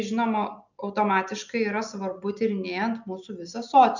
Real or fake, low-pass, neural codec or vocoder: real; 7.2 kHz; none